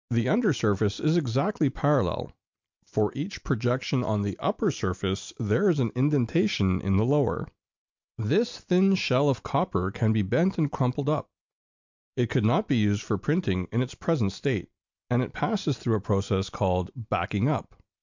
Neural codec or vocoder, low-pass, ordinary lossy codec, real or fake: none; 7.2 kHz; MP3, 64 kbps; real